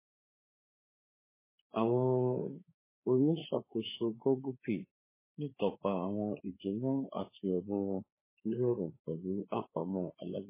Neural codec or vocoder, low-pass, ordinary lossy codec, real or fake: codec, 16 kHz, 4 kbps, FreqCodec, larger model; 3.6 kHz; MP3, 16 kbps; fake